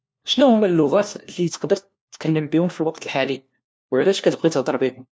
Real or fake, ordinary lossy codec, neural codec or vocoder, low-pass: fake; none; codec, 16 kHz, 1 kbps, FunCodec, trained on LibriTTS, 50 frames a second; none